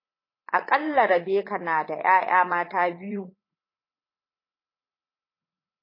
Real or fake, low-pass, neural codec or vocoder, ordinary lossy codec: fake; 5.4 kHz; vocoder, 44.1 kHz, 80 mel bands, Vocos; MP3, 24 kbps